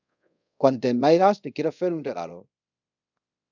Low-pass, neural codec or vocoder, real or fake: 7.2 kHz; codec, 24 kHz, 0.5 kbps, DualCodec; fake